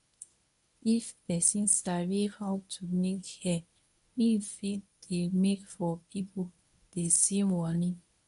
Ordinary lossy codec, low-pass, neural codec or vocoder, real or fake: none; 10.8 kHz; codec, 24 kHz, 0.9 kbps, WavTokenizer, medium speech release version 1; fake